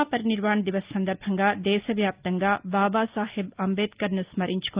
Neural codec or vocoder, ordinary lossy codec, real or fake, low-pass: none; Opus, 32 kbps; real; 3.6 kHz